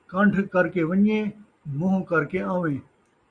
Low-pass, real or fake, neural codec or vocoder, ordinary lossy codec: 9.9 kHz; real; none; Opus, 64 kbps